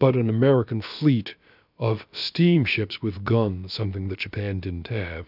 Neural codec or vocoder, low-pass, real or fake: codec, 16 kHz, about 1 kbps, DyCAST, with the encoder's durations; 5.4 kHz; fake